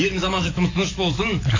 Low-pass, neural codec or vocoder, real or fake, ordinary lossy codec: 7.2 kHz; autoencoder, 48 kHz, 128 numbers a frame, DAC-VAE, trained on Japanese speech; fake; AAC, 32 kbps